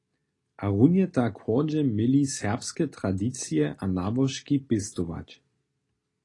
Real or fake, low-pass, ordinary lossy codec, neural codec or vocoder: real; 10.8 kHz; AAC, 32 kbps; none